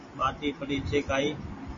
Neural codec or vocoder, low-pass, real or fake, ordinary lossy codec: none; 7.2 kHz; real; MP3, 32 kbps